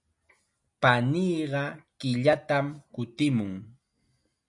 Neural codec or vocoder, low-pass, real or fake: none; 10.8 kHz; real